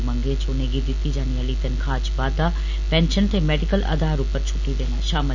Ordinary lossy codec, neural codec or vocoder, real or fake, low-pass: none; none; real; 7.2 kHz